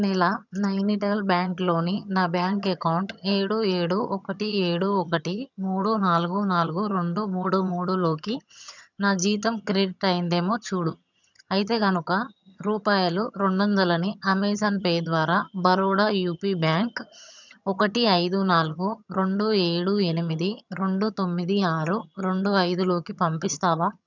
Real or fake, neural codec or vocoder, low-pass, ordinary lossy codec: fake; vocoder, 22.05 kHz, 80 mel bands, HiFi-GAN; 7.2 kHz; none